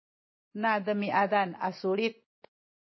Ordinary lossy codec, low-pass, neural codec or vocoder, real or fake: MP3, 24 kbps; 7.2 kHz; none; real